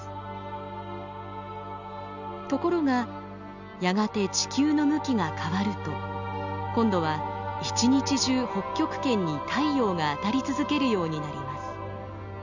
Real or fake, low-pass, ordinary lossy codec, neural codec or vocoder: real; 7.2 kHz; none; none